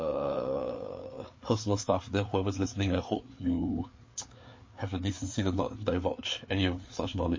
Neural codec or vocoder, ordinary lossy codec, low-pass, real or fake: codec, 16 kHz, 4 kbps, FreqCodec, larger model; MP3, 32 kbps; 7.2 kHz; fake